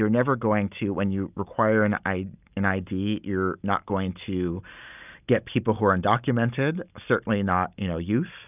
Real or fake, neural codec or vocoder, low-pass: real; none; 3.6 kHz